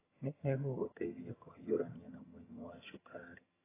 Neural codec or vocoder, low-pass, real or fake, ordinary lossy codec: vocoder, 22.05 kHz, 80 mel bands, HiFi-GAN; 3.6 kHz; fake; AAC, 16 kbps